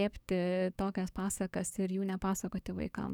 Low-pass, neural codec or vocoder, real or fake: 19.8 kHz; codec, 44.1 kHz, 7.8 kbps, DAC; fake